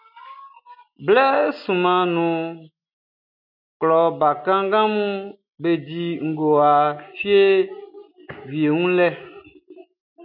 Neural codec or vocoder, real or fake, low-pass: none; real; 5.4 kHz